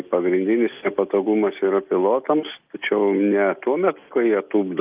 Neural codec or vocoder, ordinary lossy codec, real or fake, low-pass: none; Opus, 32 kbps; real; 3.6 kHz